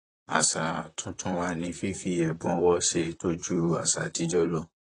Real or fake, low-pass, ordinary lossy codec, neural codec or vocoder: fake; 10.8 kHz; AAC, 32 kbps; vocoder, 44.1 kHz, 128 mel bands, Pupu-Vocoder